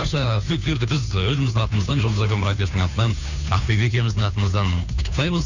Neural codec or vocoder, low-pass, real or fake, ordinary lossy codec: codec, 16 kHz, 2 kbps, FunCodec, trained on Chinese and English, 25 frames a second; 7.2 kHz; fake; none